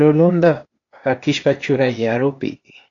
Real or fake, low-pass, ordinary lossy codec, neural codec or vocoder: fake; 7.2 kHz; AAC, 48 kbps; codec, 16 kHz, 0.8 kbps, ZipCodec